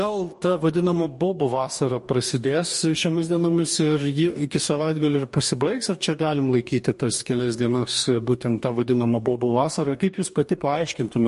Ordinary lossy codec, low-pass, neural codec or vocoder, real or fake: MP3, 48 kbps; 14.4 kHz; codec, 44.1 kHz, 2.6 kbps, DAC; fake